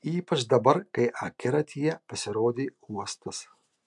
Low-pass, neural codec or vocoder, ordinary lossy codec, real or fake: 10.8 kHz; none; MP3, 96 kbps; real